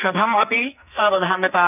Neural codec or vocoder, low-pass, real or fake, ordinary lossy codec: codec, 44.1 kHz, 2.6 kbps, SNAC; 3.6 kHz; fake; none